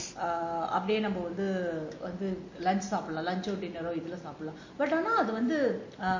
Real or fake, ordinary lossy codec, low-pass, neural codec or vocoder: real; MP3, 32 kbps; 7.2 kHz; none